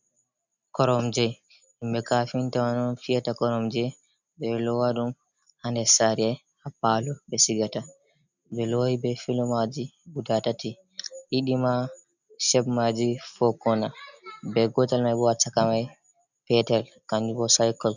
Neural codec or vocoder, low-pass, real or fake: none; 7.2 kHz; real